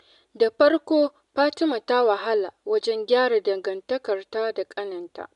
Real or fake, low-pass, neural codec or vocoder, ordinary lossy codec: real; 10.8 kHz; none; none